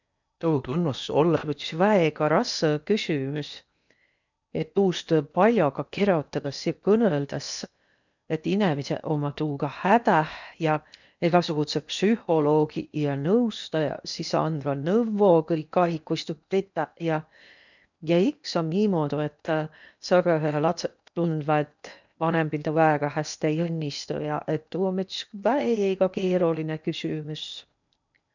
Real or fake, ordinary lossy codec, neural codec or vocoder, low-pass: fake; none; codec, 16 kHz in and 24 kHz out, 0.8 kbps, FocalCodec, streaming, 65536 codes; 7.2 kHz